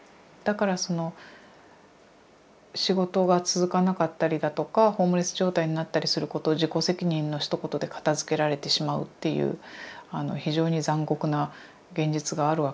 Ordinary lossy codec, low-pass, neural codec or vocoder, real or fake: none; none; none; real